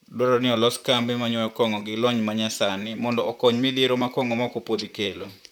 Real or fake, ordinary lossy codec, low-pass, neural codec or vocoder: fake; none; 19.8 kHz; vocoder, 44.1 kHz, 128 mel bands, Pupu-Vocoder